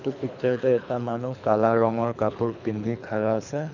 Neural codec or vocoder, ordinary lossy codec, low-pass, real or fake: codec, 24 kHz, 3 kbps, HILCodec; none; 7.2 kHz; fake